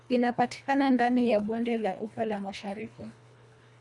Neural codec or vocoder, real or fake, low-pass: codec, 24 kHz, 1.5 kbps, HILCodec; fake; 10.8 kHz